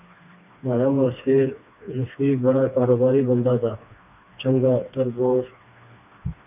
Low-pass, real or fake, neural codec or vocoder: 3.6 kHz; fake; codec, 16 kHz, 2 kbps, FreqCodec, smaller model